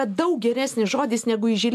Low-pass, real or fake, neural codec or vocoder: 14.4 kHz; real; none